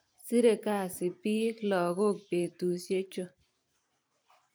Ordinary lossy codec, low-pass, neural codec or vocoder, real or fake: none; none; vocoder, 44.1 kHz, 128 mel bands every 512 samples, BigVGAN v2; fake